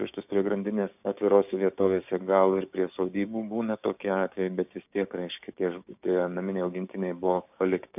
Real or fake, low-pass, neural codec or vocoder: fake; 3.6 kHz; codec, 16 kHz, 6 kbps, DAC